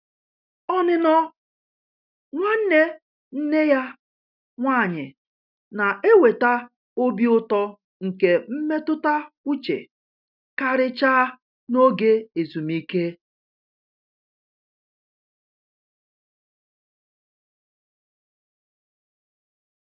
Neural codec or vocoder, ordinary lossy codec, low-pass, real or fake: none; none; 5.4 kHz; real